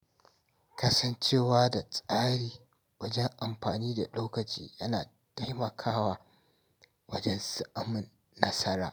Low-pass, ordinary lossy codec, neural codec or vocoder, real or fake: none; none; none; real